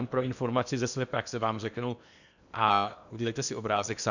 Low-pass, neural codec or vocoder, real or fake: 7.2 kHz; codec, 16 kHz in and 24 kHz out, 0.8 kbps, FocalCodec, streaming, 65536 codes; fake